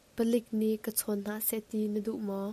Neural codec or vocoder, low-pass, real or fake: none; 14.4 kHz; real